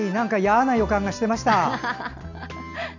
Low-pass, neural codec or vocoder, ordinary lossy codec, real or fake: 7.2 kHz; none; none; real